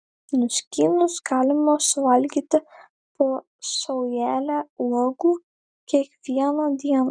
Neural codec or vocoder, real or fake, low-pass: none; real; 9.9 kHz